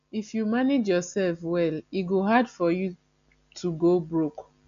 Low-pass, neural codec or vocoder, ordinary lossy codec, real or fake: 7.2 kHz; none; none; real